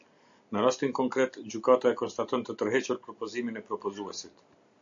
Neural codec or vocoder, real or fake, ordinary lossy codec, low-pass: none; real; AAC, 64 kbps; 7.2 kHz